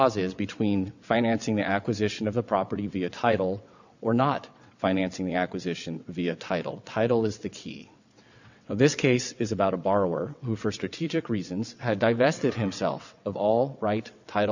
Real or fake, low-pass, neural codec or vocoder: fake; 7.2 kHz; vocoder, 22.05 kHz, 80 mel bands, WaveNeXt